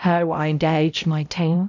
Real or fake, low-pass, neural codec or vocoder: fake; 7.2 kHz; codec, 16 kHz, 0.5 kbps, X-Codec, HuBERT features, trained on balanced general audio